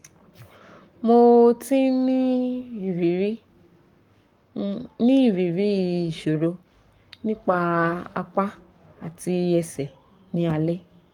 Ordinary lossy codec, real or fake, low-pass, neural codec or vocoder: Opus, 32 kbps; fake; 19.8 kHz; codec, 44.1 kHz, 7.8 kbps, Pupu-Codec